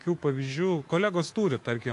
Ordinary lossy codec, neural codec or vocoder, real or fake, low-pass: AAC, 48 kbps; codec, 24 kHz, 3.1 kbps, DualCodec; fake; 10.8 kHz